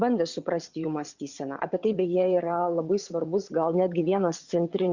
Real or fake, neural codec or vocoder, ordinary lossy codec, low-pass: fake; vocoder, 22.05 kHz, 80 mel bands, WaveNeXt; Opus, 64 kbps; 7.2 kHz